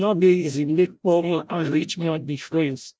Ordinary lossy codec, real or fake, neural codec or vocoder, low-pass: none; fake; codec, 16 kHz, 0.5 kbps, FreqCodec, larger model; none